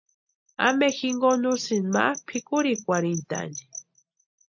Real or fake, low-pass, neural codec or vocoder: real; 7.2 kHz; none